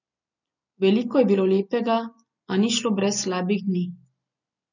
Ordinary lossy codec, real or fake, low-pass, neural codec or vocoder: none; real; 7.2 kHz; none